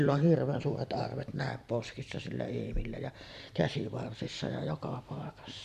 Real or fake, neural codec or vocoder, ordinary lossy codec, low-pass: fake; vocoder, 44.1 kHz, 128 mel bands every 512 samples, BigVGAN v2; none; 14.4 kHz